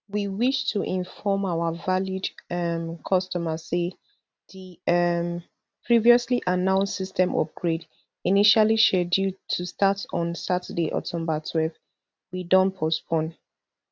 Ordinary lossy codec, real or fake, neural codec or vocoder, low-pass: none; real; none; none